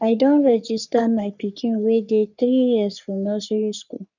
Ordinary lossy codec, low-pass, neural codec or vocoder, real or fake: none; 7.2 kHz; codec, 44.1 kHz, 3.4 kbps, Pupu-Codec; fake